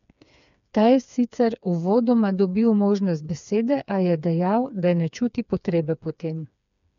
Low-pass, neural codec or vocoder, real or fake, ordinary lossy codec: 7.2 kHz; codec, 16 kHz, 4 kbps, FreqCodec, smaller model; fake; none